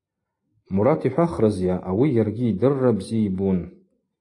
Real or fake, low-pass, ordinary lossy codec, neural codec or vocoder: real; 10.8 kHz; AAC, 48 kbps; none